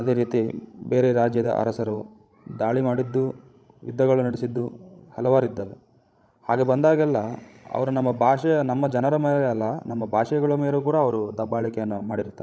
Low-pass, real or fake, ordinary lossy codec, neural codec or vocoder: none; fake; none; codec, 16 kHz, 16 kbps, FreqCodec, larger model